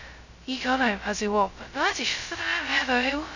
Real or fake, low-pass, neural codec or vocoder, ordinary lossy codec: fake; 7.2 kHz; codec, 16 kHz, 0.2 kbps, FocalCodec; none